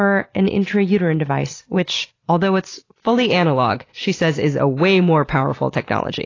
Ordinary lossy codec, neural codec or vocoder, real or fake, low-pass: AAC, 32 kbps; none; real; 7.2 kHz